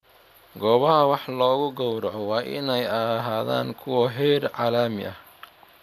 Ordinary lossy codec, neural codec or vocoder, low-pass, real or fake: none; none; 14.4 kHz; real